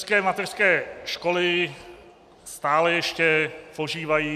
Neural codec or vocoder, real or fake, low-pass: none; real; 14.4 kHz